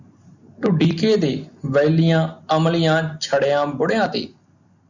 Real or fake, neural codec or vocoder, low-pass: real; none; 7.2 kHz